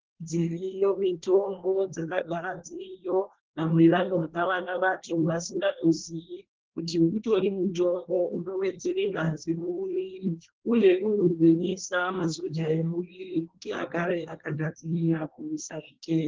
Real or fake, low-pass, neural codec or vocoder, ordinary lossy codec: fake; 7.2 kHz; codec, 24 kHz, 1 kbps, SNAC; Opus, 16 kbps